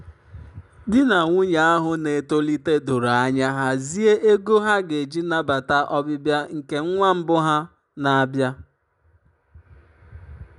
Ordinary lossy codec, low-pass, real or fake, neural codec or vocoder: none; 10.8 kHz; real; none